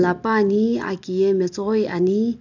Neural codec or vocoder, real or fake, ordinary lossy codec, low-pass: none; real; none; 7.2 kHz